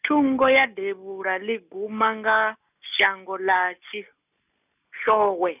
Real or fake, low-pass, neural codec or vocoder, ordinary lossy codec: real; 3.6 kHz; none; none